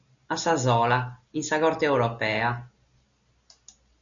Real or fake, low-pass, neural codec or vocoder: real; 7.2 kHz; none